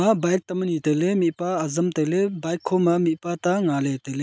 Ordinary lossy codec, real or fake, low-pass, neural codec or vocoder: none; real; none; none